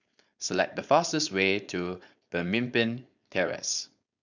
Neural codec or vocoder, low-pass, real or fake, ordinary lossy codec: codec, 16 kHz, 4.8 kbps, FACodec; 7.2 kHz; fake; none